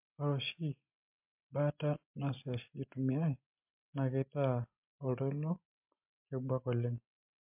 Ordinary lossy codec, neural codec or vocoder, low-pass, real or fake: MP3, 32 kbps; none; 3.6 kHz; real